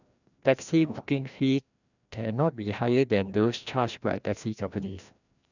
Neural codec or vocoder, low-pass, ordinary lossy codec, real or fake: codec, 16 kHz, 1 kbps, FreqCodec, larger model; 7.2 kHz; none; fake